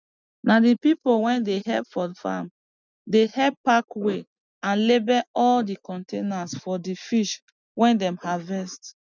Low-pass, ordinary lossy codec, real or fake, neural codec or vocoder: 7.2 kHz; none; real; none